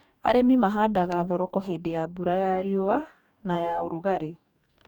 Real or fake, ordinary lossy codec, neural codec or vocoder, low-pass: fake; none; codec, 44.1 kHz, 2.6 kbps, DAC; 19.8 kHz